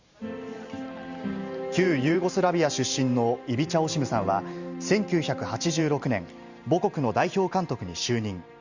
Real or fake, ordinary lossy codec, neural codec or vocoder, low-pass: fake; Opus, 64 kbps; vocoder, 44.1 kHz, 128 mel bands every 512 samples, BigVGAN v2; 7.2 kHz